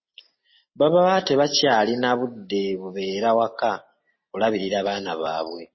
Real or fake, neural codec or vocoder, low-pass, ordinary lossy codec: real; none; 7.2 kHz; MP3, 24 kbps